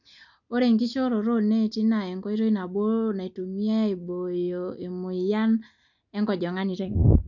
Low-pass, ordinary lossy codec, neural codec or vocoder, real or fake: 7.2 kHz; none; none; real